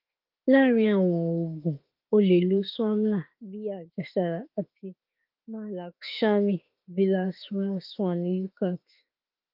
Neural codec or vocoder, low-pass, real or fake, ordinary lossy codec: autoencoder, 48 kHz, 32 numbers a frame, DAC-VAE, trained on Japanese speech; 5.4 kHz; fake; Opus, 32 kbps